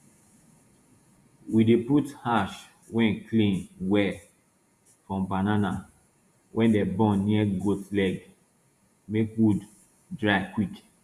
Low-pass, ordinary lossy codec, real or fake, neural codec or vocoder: 14.4 kHz; Opus, 64 kbps; fake; vocoder, 48 kHz, 128 mel bands, Vocos